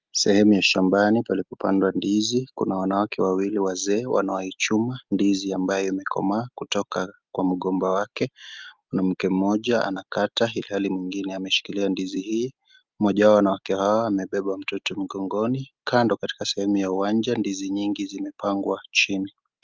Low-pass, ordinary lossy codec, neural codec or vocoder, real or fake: 7.2 kHz; Opus, 32 kbps; none; real